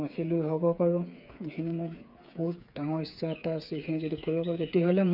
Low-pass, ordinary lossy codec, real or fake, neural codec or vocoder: 5.4 kHz; none; fake; codec, 16 kHz, 8 kbps, FreqCodec, smaller model